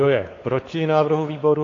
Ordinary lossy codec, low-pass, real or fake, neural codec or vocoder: AAC, 32 kbps; 7.2 kHz; fake; codec, 16 kHz, 4 kbps, X-Codec, WavLM features, trained on Multilingual LibriSpeech